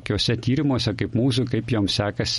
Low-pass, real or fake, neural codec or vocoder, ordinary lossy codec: 19.8 kHz; real; none; MP3, 48 kbps